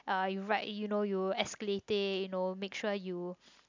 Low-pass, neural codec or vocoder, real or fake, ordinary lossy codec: 7.2 kHz; none; real; MP3, 64 kbps